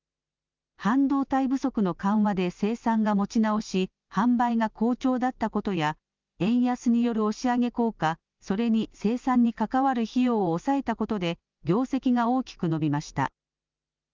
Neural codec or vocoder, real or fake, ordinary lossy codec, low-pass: none; real; Opus, 24 kbps; 7.2 kHz